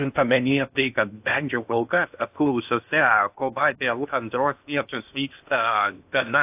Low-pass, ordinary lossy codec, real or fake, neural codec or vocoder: 3.6 kHz; AAC, 32 kbps; fake; codec, 16 kHz in and 24 kHz out, 0.6 kbps, FocalCodec, streaming, 4096 codes